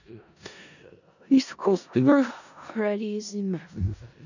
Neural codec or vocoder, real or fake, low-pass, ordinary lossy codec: codec, 16 kHz in and 24 kHz out, 0.4 kbps, LongCat-Audio-Codec, four codebook decoder; fake; 7.2 kHz; none